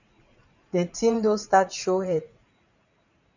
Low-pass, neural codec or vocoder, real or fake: 7.2 kHz; vocoder, 44.1 kHz, 80 mel bands, Vocos; fake